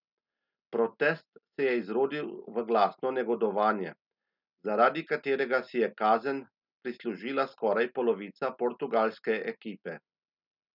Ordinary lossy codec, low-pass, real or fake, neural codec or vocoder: none; 5.4 kHz; real; none